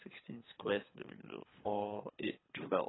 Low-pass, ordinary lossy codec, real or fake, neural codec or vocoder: 7.2 kHz; AAC, 16 kbps; fake; codec, 24 kHz, 3 kbps, HILCodec